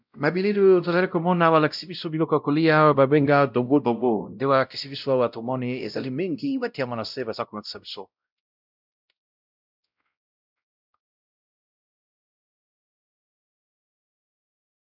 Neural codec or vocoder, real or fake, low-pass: codec, 16 kHz, 0.5 kbps, X-Codec, WavLM features, trained on Multilingual LibriSpeech; fake; 5.4 kHz